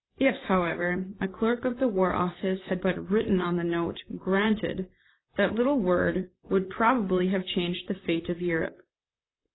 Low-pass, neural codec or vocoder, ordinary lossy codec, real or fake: 7.2 kHz; vocoder, 44.1 kHz, 128 mel bands, Pupu-Vocoder; AAC, 16 kbps; fake